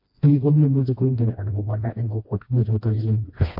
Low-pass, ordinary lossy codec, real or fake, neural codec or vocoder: 5.4 kHz; MP3, 32 kbps; fake; codec, 16 kHz, 1 kbps, FreqCodec, smaller model